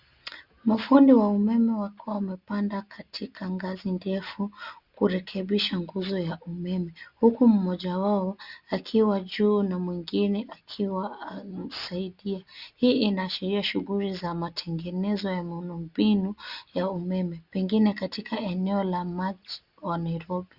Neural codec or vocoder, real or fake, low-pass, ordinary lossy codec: none; real; 5.4 kHz; Opus, 64 kbps